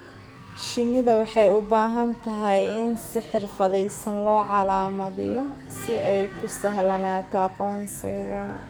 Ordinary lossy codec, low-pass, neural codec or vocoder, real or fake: none; none; codec, 44.1 kHz, 2.6 kbps, SNAC; fake